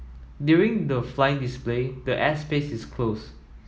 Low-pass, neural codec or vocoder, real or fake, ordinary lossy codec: none; none; real; none